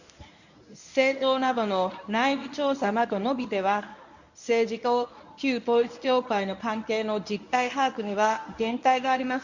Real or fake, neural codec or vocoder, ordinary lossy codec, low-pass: fake; codec, 24 kHz, 0.9 kbps, WavTokenizer, medium speech release version 1; none; 7.2 kHz